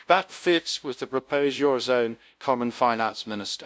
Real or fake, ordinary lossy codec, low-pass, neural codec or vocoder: fake; none; none; codec, 16 kHz, 0.5 kbps, FunCodec, trained on LibriTTS, 25 frames a second